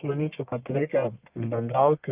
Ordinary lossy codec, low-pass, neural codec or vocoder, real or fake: Opus, 24 kbps; 3.6 kHz; codec, 44.1 kHz, 1.7 kbps, Pupu-Codec; fake